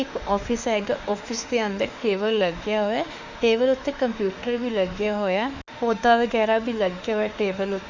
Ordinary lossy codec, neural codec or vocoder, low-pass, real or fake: Opus, 64 kbps; autoencoder, 48 kHz, 32 numbers a frame, DAC-VAE, trained on Japanese speech; 7.2 kHz; fake